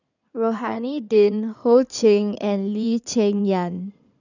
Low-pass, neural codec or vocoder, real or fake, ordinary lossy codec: 7.2 kHz; codec, 16 kHz in and 24 kHz out, 2.2 kbps, FireRedTTS-2 codec; fake; none